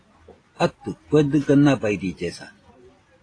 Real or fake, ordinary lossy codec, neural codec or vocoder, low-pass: real; AAC, 32 kbps; none; 9.9 kHz